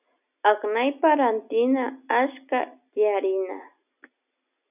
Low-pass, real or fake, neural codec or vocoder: 3.6 kHz; real; none